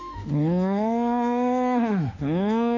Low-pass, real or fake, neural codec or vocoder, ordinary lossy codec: 7.2 kHz; fake; autoencoder, 48 kHz, 32 numbers a frame, DAC-VAE, trained on Japanese speech; none